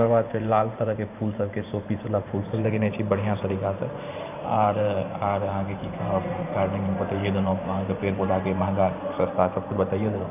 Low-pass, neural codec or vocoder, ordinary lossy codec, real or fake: 3.6 kHz; vocoder, 44.1 kHz, 128 mel bands every 512 samples, BigVGAN v2; none; fake